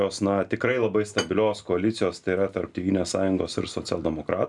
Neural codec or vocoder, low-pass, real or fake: none; 10.8 kHz; real